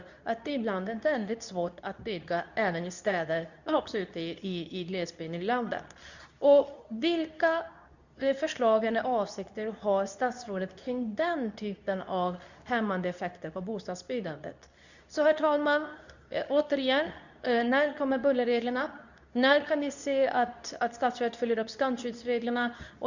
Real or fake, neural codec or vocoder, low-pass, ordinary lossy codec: fake; codec, 24 kHz, 0.9 kbps, WavTokenizer, medium speech release version 2; 7.2 kHz; none